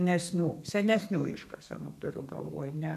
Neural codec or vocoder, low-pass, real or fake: codec, 32 kHz, 1.9 kbps, SNAC; 14.4 kHz; fake